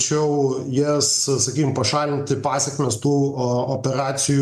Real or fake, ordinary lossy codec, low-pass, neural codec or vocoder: real; MP3, 96 kbps; 14.4 kHz; none